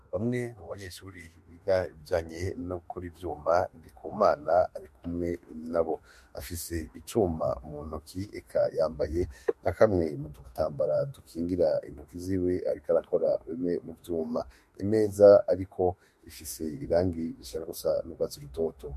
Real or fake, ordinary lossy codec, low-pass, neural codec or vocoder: fake; MP3, 64 kbps; 14.4 kHz; autoencoder, 48 kHz, 32 numbers a frame, DAC-VAE, trained on Japanese speech